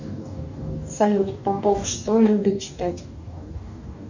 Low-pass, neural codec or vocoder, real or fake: 7.2 kHz; codec, 44.1 kHz, 2.6 kbps, DAC; fake